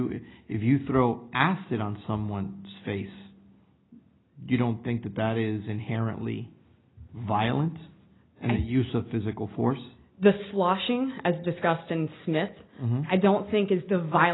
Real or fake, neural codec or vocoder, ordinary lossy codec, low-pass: real; none; AAC, 16 kbps; 7.2 kHz